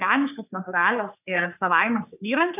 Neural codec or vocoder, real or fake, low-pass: codec, 44.1 kHz, 3.4 kbps, Pupu-Codec; fake; 3.6 kHz